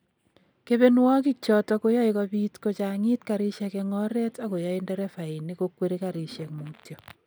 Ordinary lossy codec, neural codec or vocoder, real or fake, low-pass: none; none; real; none